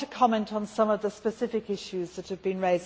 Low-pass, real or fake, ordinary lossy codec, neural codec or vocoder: none; real; none; none